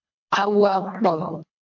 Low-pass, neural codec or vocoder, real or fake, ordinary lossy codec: 7.2 kHz; codec, 24 kHz, 1.5 kbps, HILCodec; fake; MP3, 48 kbps